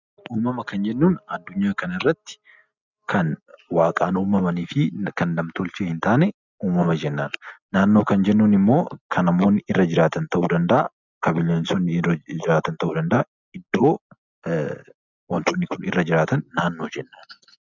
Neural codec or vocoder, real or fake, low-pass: none; real; 7.2 kHz